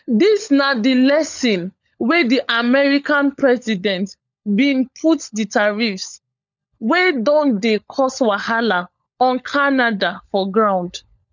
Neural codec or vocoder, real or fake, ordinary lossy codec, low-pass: codec, 16 kHz, 16 kbps, FunCodec, trained on LibriTTS, 50 frames a second; fake; none; 7.2 kHz